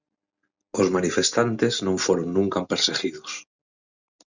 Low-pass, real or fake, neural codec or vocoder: 7.2 kHz; real; none